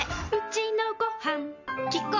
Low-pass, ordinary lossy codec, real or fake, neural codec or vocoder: 7.2 kHz; MP3, 64 kbps; real; none